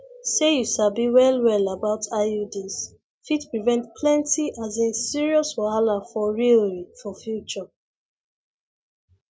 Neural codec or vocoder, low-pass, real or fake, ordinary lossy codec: none; none; real; none